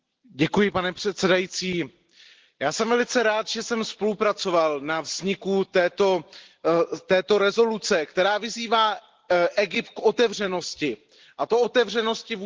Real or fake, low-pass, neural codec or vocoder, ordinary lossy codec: real; 7.2 kHz; none; Opus, 16 kbps